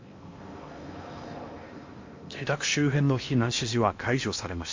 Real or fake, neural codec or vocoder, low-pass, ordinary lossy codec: fake; codec, 16 kHz in and 24 kHz out, 0.8 kbps, FocalCodec, streaming, 65536 codes; 7.2 kHz; MP3, 48 kbps